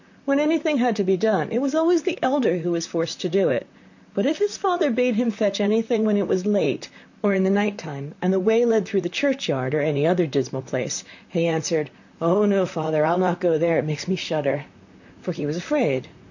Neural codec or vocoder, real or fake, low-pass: vocoder, 44.1 kHz, 128 mel bands, Pupu-Vocoder; fake; 7.2 kHz